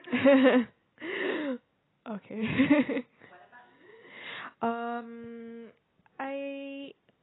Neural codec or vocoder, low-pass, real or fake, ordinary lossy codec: none; 7.2 kHz; real; AAC, 16 kbps